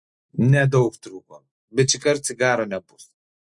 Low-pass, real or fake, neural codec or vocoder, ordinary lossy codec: 10.8 kHz; real; none; MP3, 48 kbps